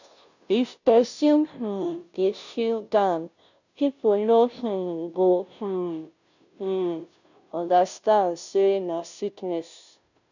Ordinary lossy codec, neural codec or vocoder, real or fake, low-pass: none; codec, 16 kHz, 0.5 kbps, FunCodec, trained on Chinese and English, 25 frames a second; fake; 7.2 kHz